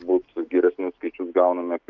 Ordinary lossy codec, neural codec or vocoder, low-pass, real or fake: Opus, 32 kbps; none; 7.2 kHz; real